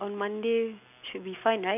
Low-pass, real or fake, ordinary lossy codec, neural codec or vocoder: 3.6 kHz; real; none; none